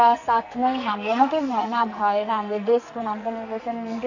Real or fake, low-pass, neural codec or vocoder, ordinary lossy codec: fake; 7.2 kHz; codec, 44.1 kHz, 2.6 kbps, SNAC; none